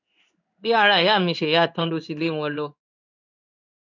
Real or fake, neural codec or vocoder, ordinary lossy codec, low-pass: fake; codec, 16 kHz in and 24 kHz out, 1 kbps, XY-Tokenizer; none; 7.2 kHz